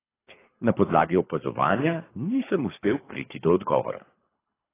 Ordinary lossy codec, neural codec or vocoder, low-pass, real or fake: AAC, 16 kbps; codec, 24 kHz, 3 kbps, HILCodec; 3.6 kHz; fake